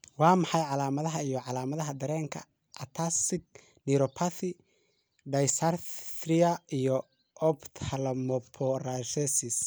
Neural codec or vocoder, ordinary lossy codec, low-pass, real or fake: none; none; none; real